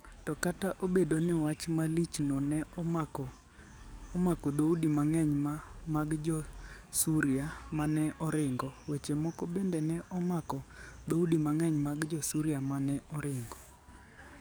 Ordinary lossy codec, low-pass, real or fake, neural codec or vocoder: none; none; fake; codec, 44.1 kHz, 7.8 kbps, DAC